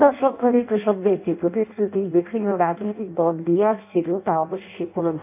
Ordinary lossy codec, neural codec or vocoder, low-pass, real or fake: none; codec, 16 kHz in and 24 kHz out, 0.6 kbps, FireRedTTS-2 codec; 3.6 kHz; fake